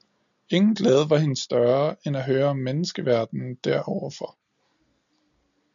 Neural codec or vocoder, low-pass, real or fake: none; 7.2 kHz; real